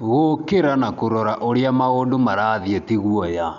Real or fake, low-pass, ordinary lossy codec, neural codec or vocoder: real; 7.2 kHz; none; none